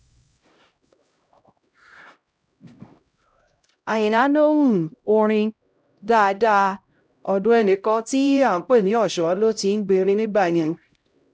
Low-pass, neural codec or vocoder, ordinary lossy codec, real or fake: none; codec, 16 kHz, 0.5 kbps, X-Codec, HuBERT features, trained on LibriSpeech; none; fake